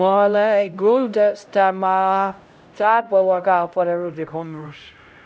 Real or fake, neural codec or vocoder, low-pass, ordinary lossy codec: fake; codec, 16 kHz, 0.5 kbps, X-Codec, HuBERT features, trained on LibriSpeech; none; none